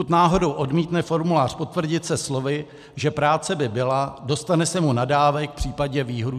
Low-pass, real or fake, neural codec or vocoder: 14.4 kHz; real; none